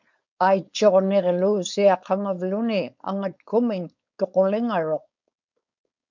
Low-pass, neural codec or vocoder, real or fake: 7.2 kHz; codec, 16 kHz, 4.8 kbps, FACodec; fake